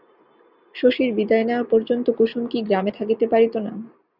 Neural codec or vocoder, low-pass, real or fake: none; 5.4 kHz; real